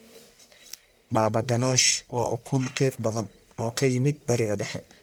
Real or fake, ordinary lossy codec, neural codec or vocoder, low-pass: fake; none; codec, 44.1 kHz, 1.7 kbps, Pupu-Codec; none